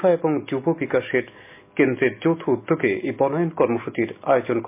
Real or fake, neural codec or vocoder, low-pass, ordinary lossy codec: real; none; 3.6 kHz; MP3, 24 kbps